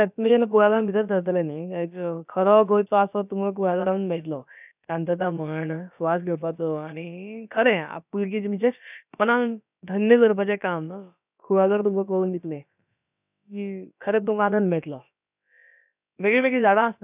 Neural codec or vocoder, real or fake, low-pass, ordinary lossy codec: codec, 16 kHz, about 1 kbps, DyCAST, with the encoder's durations; fake; 3.6 kHz; none